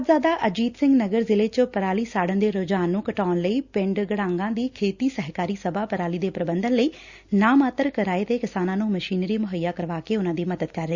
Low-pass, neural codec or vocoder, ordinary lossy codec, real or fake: 7.2 kHz; none; Opus, 64 kbps; real